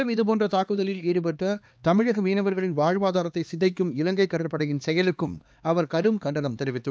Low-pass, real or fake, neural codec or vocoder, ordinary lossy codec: none; fake; codec, 16 kHz, 2 kbps, X-Codec, HuBERT features, trained on LibriSpeech; none